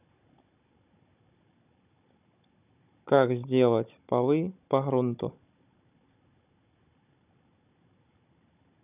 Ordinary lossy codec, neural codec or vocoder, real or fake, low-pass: none; codec, 16 kHz, 16 kbps, FunCodec, trained on Chinese and English, 50 frames a second; fake; 3.6 kHz